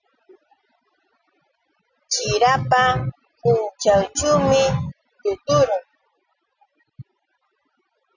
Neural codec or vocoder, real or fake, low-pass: none; real; 7.2 kHz